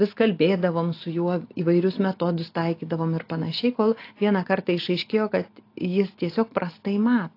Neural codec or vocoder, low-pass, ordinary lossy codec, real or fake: none; 5.4 kHz; AAC, 32 kbps; real